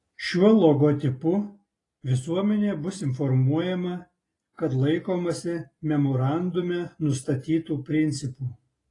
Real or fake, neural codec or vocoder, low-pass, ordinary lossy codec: real; none; 10.8 kHz; AAC, 32 kbps